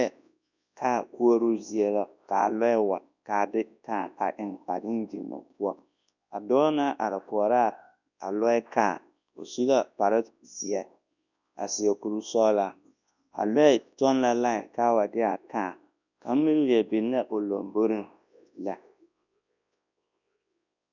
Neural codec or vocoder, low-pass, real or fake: codec, 24 kHz, 0.9 kbps, WavTokenizer, large speech release; 7.2 kHz; fake